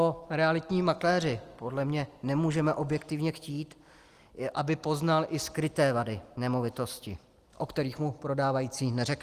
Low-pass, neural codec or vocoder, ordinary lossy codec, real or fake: 14.4 kHz; none; Opus, 24 kbps; real